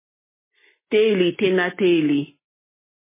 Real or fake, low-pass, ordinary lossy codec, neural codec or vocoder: real; 3.6 kHz; MP3, 16 kbps; none